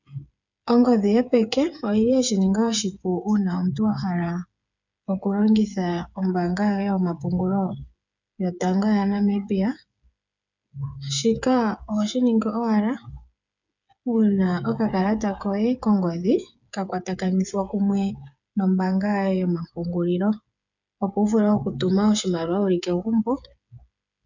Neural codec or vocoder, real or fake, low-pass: codec, 16 kHz, 8 kbps, FreqCodec, smaller model; fake; 7.2 kHz